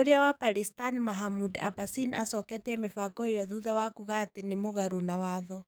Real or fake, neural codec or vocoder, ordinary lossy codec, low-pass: fake; codec, 44.1 kHz, 2.6 kbps, SNAC; none; none